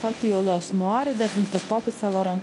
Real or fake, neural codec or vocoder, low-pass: fake; codec, 24 kHz, 0.9 kbps, WavTokenizer, medium speech release version 1; 10.8 kHz